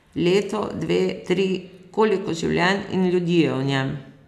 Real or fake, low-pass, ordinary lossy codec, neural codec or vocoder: real; 14.4 kHz; none; none